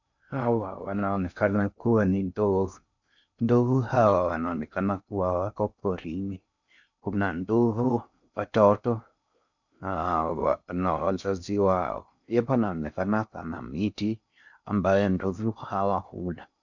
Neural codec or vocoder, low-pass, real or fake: codec, 16 kHz in and 24 kHz out, 0.6 kbps, FocalCodec, streaming, 2048 codes; 7.2 kHz; fake